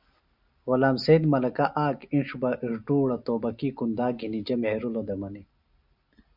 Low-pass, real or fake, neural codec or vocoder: 5.4 kHz; real; none